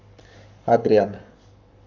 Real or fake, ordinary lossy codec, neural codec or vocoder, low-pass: fake; none; codec, 44.1 kHz, 7.8 kbps, Pupu-Codec; 7.2 kHz